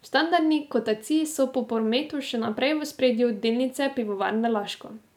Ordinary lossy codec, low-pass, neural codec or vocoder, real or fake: none; 19.8 kHz; none; real